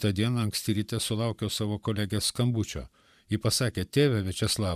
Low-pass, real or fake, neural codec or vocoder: 14.4 kHz; fake; vocoder, 44.1 kHz, 128 mel bands every 512 samples, BigVGAN v2